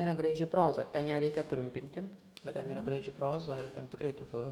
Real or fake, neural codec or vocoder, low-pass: fake; codec, 44.1 kHz, 2.6 kbps, DAC; 19.8 kHz